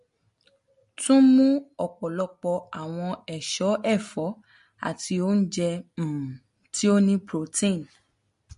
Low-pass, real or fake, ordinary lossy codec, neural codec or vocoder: 10.8 kHz; real; MP3, 48 kbps; none